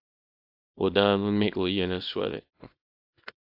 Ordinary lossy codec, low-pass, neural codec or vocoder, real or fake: AAC, 48 kbps; 5.4 kHz; codec, 24 kHz, 0.9 kbps, WavTokenizer, small release; fake